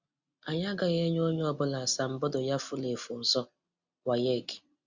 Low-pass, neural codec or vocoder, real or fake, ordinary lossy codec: 7.2 kHz; none; real; Opus, 64 kbps